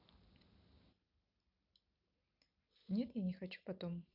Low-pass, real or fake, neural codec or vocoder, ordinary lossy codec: 5.4 kHz; real; none; none